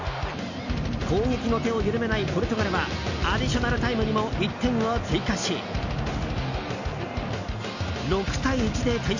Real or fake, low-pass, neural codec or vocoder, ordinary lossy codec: real; 7.2 kHz; none; none